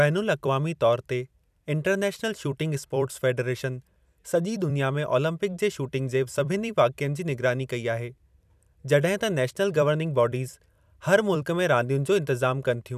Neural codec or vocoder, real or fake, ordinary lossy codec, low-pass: vocoder, 44.1 kHz, 128 mel bands every 256 samples, BigVGAN v2; fake; none; 14.4 kHz